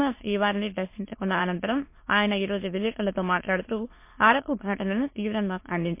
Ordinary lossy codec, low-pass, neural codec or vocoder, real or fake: MP3, 24 kbps; 3.6 kHz; autoencoder, 22.05 kHz, a latent of 192 numbers a frame, VITS, trained on many speakers; fake